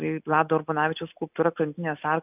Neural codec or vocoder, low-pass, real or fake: none; 3.6 kHz; real